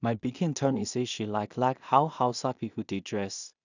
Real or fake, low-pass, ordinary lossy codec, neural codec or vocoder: fake; 7.2 kHz; none; codec, 16 kHz in and 24 kHz out, 0.4 kbps, LongCat-Audio-Codec, two codebook decoder